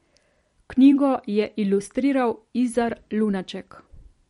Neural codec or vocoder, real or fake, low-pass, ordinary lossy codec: vocoder, 44.1 kHz, 128 mel bands every 256 samples, BigVGAN v2; fake; 19.8 kHz; MP3, 48 kbps